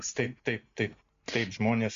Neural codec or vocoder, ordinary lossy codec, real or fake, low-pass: none; MP3, 48 kbps; real; 7.2 kHz